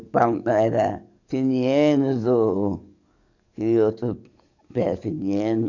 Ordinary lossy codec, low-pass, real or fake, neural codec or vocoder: none; 7.2 kHz; fake; codec, 44.1 kHz, 7.8 kbps, DAC